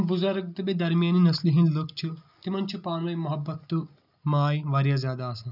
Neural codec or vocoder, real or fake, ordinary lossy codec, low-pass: none; real; none; 5.4 kHz